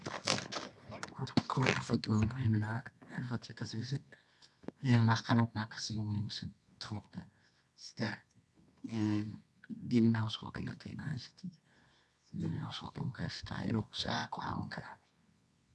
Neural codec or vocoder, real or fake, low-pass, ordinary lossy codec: codec, 24 kHz, 0.9 kbps, WavTokenizer, medium music audio release; fake; none; none